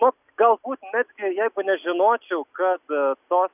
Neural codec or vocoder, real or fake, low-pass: none; real; 3.6 kHz